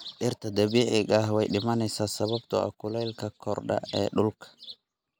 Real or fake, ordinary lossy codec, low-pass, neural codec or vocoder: real; none; none; none